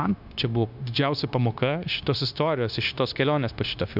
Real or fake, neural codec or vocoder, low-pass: fake; codec, 16 kHz, 0.9 kbps, LongCat-Audio-Codec; 5.4 kHz